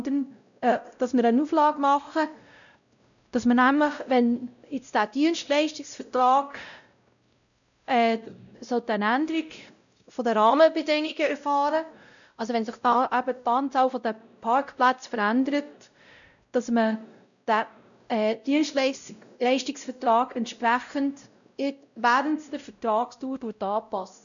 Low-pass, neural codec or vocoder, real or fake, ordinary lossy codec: 7.2 kHz; codec, 16 kHz, 0.5 kbps, X-Codec, WavLM features, trained on Multilingual LibriSpeech; fake; none